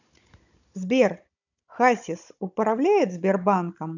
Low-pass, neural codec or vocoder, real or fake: 7.2 kHz; codec, 16 kHz, 16 kbps, FunCodec, trained on Chinese and English, 50 frames a second; fake